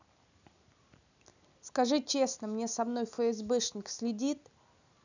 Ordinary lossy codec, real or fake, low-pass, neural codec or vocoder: none; real; 7.2 kHz; none